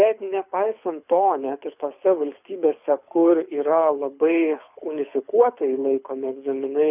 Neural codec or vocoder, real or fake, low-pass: codec, 24 kHz, 6 kbps, HILCodec; fake; 3.6 kHz